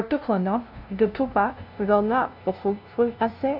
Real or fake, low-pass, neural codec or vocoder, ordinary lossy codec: fake; 5.4 kHz; codec, 16 kHz, 0.5 kbps, FunCodec, trained on LibriTTS, 25 frames a second; none